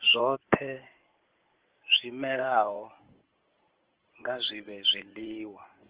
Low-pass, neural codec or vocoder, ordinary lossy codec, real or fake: 3.6 kHz; codec, 16 kHz in and 24 kHz out, 2.2 kbps, FireRedTTS-2 codec; Opus, 16 kbps; fake